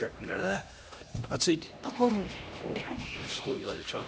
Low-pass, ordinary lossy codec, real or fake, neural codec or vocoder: none; none; fake; codec, 16 kHz, 1 kbps, X-Codec, HuBERT features, trained on LibriSpeech